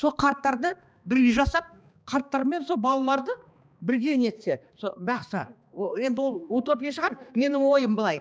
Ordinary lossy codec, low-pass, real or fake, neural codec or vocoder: none; none; fake; codec, 16 kHz, 2 kbps, X-Codec, HuBERT features, trained on balanced general audio